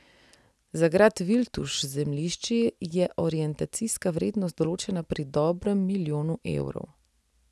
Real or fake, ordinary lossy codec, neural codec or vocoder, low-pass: real; none; none; none